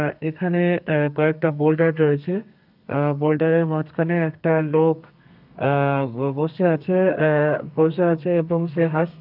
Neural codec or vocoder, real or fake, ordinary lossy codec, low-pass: codec, 32 kHz, 1.9 kbps, SNAC; fake; none; 5.4 kHz